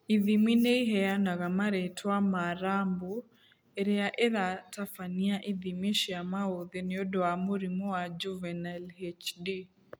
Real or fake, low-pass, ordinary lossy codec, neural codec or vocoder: real; none; none; none